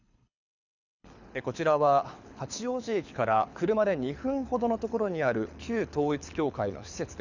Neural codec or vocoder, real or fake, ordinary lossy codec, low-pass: codec, 24 kHz, 6 kbps, HILCodec; fake; none; 7.2 kHz